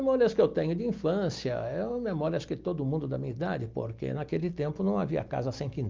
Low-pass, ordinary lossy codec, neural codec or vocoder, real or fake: 7.2 kHz; Opus, 32 kbps; none; real